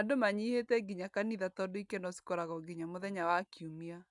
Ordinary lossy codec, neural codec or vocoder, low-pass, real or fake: none; none; 10.8 kHz; real